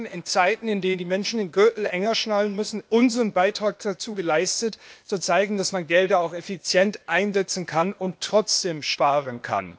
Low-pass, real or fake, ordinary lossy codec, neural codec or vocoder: none; fake; none; codec, 16 kHz, 0.8 kbps, ZipCodec